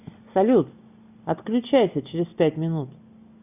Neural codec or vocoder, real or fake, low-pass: none; real; 3.6 kHz